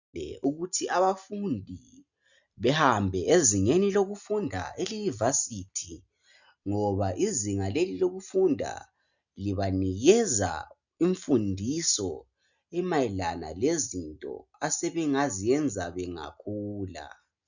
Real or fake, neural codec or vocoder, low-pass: real; none; 7.2 kHz